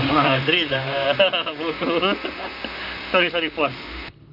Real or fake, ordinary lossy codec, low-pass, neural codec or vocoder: fake; none; 5.4 kHz; vocoder, 44.1 kHz, 128 mel bands, Pupu-Vocoder